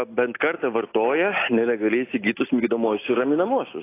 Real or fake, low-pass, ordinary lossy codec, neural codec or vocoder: real; 3.6 kHz; AAC, 24 kbps; none